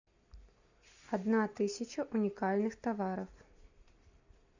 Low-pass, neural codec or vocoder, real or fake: 7.2 kHz; none; real